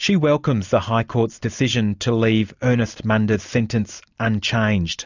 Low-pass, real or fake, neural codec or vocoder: 7.2 kHz; real; none